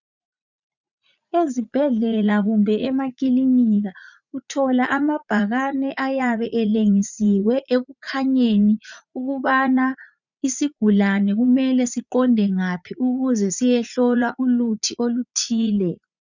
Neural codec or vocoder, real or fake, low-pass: vocoder, 44.1 kHz, 80 mel bands, Vocos; fake; 7.2 kHz